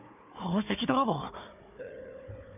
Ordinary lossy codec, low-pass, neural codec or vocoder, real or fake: none; 3.6 kHz; codec, 16 kHz, 4 kbps, FunCodec, trained on Chinese and English, 50 frames a second; fake